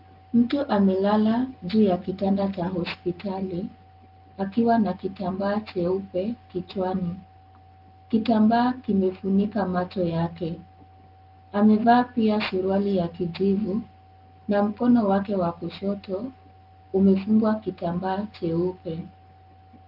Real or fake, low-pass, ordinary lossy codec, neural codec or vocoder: real; 5.4 kHz; Opus, 24 kbps; none